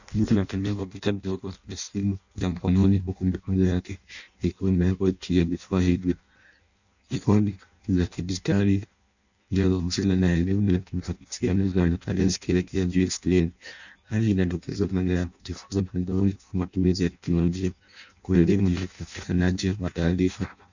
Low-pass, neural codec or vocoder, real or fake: 7.2 kHz; codec, 16 kHz in and 24 kHz out, 0.6 kbps, FireRedTTS-2 codec; fake